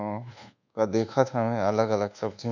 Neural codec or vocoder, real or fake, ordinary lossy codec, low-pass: codec, 24 kHz, 1.2 kbps, DualCodec; fake; none; 7.2 kHz